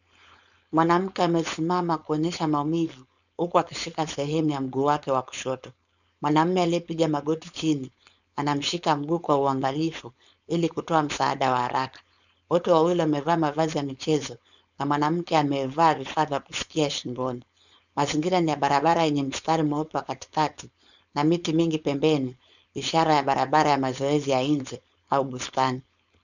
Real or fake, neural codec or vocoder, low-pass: fake; codec, 16 kHz, 4.8 kbps, FACodec; 7.2 kHz